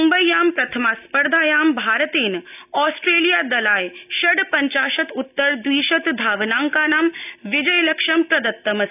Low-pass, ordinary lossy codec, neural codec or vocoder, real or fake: 3.6 kHz; none; none; real